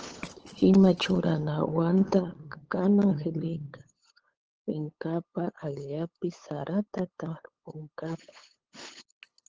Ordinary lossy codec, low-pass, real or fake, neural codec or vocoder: Opus, 16 kbps; 7.2 kHz; fake; codec, 16 kHz, 8 kbps, FunCodec, trained on LibriTTS, 25 frames a second